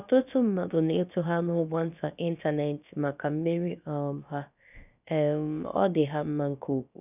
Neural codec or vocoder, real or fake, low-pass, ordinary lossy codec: codec, 16 kHz, about 1 kbps, DyCAST, with the encoder's durations; fake; 3.6 kHz; none